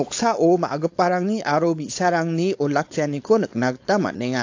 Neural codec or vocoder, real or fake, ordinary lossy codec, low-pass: codec, 16 kHz, 4.8 kbps, FACodec; fake; AAC, 48 kbps; 7.2 kHz